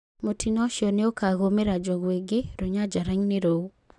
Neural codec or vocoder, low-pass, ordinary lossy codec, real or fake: none; 10.8 kHz; none; real